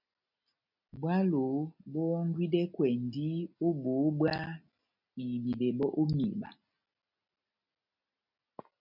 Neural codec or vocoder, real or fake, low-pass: none; real; 5.4 kHz